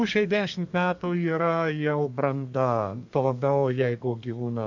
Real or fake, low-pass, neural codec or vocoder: fake; 7.2 kHz; codec, 32 kHz, 1.9 kbps, SNAC